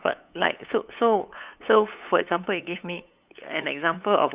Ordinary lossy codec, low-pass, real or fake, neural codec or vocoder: Opus, 64 kbps; 3.6 kHz; fake; codec, 16 kHz, 8 kbps, FunCodec, trained on LibriTTS, 25 frames a second